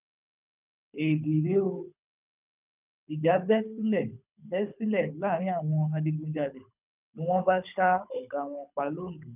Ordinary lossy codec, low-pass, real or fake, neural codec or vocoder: none; 3.6 kHz; fake; codec, 24 kHz, 6 kbps, HILCodec